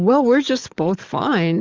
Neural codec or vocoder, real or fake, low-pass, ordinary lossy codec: codec, 44.1 kHz, 7.8 kbps, DAC; fake; 7.2 kHz; Opus, 32 kbps